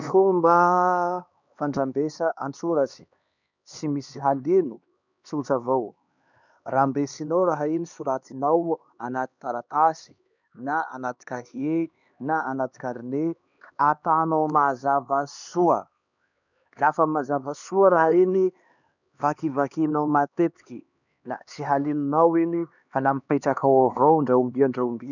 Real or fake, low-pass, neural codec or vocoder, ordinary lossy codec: fake; 7.2 kHz; codec, 16 kHz, 2 kbps, X-Codec, HuBERT features, trained on LibriSpeech; none